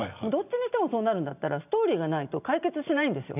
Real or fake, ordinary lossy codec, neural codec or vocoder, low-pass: real; none; none; 3.6 kHz